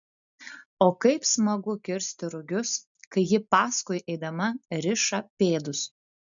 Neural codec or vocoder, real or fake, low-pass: none; real; 7.2 kHz